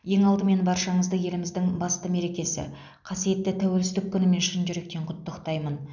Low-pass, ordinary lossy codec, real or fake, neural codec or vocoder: 7.2 kHz; none; real; none